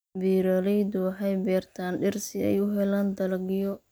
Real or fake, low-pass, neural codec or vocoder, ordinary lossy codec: real; none; none; none